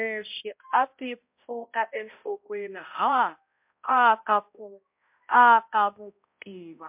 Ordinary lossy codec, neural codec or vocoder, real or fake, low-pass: MP3, 32 kbps; codec, 16 kHz, 0.5 kbps, X-Codec, HuBERT features, trained on balanced general audio; fake; 3.6 kHz